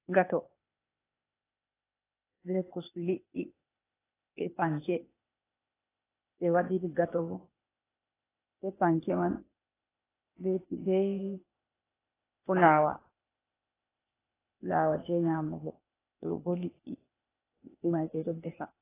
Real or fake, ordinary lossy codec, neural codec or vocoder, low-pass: fake; AAC, 16 kbps; codec, 16 kHz, 0.8 kbps, ZipCodec; 3.6 kHz